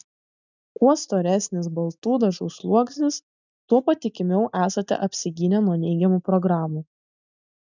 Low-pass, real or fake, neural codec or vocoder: 7.2 kHz; real; none